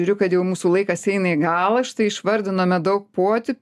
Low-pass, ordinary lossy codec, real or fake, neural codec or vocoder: 14.4 kHz; AAC, 96 kbps; real; none